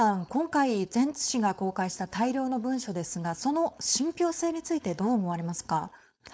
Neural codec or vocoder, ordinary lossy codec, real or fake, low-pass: codec, 16 kHz, 4.8 kbps, FACodec; none; fake; none